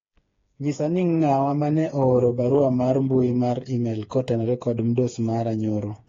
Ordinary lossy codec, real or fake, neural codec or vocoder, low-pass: AAC, 32 kbps; fake; codec, 16 kHz, 4 kbps, FreqCodec, smaller model; 7.2 kHz